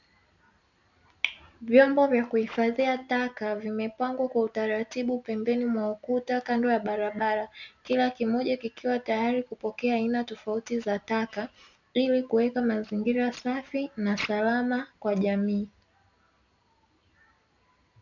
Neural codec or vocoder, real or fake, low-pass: none; real; 7.2 kHz